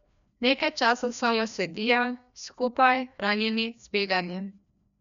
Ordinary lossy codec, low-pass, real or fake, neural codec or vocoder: none; 7.2 kHz; fake; codec, 16 kHz, 1 kbps, FreqCodec, larger model